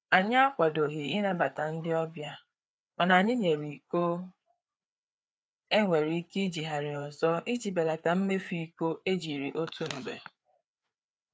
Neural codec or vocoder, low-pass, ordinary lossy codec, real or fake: codec, 16 kHz, 4 kbps, FreqCodec, larger model; none; none; fake